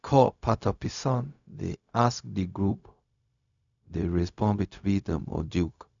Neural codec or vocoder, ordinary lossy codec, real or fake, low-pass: codec, 16 kHz, 0.4 kbps, LongCat-Audio-Codec; none; fake; 7.2 kHz